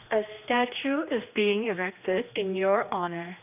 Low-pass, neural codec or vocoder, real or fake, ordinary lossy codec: 3.6 kHz; codec, 16 kHz, 2 kbps, X-Codec, HuBERT features, trained on general audio; fake; none